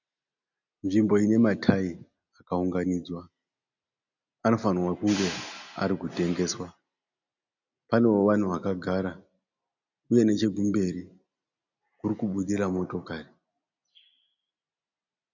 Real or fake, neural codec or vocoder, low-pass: real; none; 7.2 kHz